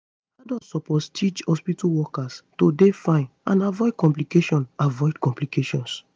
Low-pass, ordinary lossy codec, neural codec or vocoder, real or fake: none; none; none; real